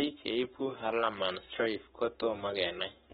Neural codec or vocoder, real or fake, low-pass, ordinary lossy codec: codec, 44.1 kHz, 7.8 kbps, Pupu-Codec; fake; 19.8 kHz; AAC, 16 kbps